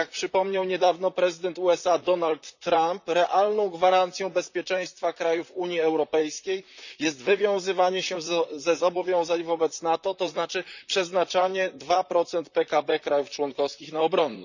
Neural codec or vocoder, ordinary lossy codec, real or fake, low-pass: vocoder, 44.1 kHz, 128 mel bands, Pupu-Vocoder; none; fake; 7.2 kHz